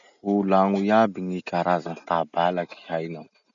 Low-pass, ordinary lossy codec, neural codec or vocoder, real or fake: 7.2 kHz; none; none; real